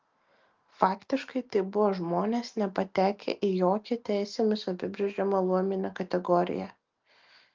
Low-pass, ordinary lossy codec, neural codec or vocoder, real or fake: 7.2 kHz; Opus, 24 kbps; none; real